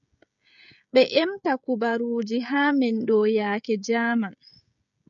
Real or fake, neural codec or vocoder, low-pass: fake; codec, 16 kHz, 16 kbps, FreqCodec, smaller model; 7.2 kHz